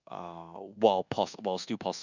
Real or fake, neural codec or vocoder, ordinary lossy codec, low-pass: fake; codec, 24 kHz, 1.2 kbps, DualCodec; none; 7.2 kHz